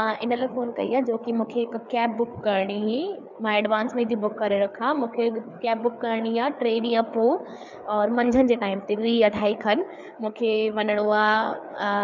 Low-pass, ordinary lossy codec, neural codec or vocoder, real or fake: 7.2 kHz; none; codec, 16 kHz, 4 kbps, FreqCodec, larger model; fake